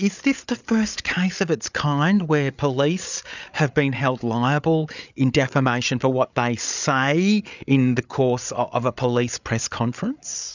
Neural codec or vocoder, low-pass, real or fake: codec, 16 kHz, 8 kbps, FreqCodec, larger model; 7.2 kHz; fake